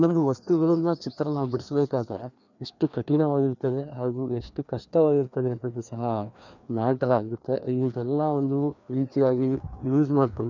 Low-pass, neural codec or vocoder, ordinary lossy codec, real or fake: 7.2 kHz; codec, 16 kHz, 2 kbps, FreqCodec, larger model; none; fake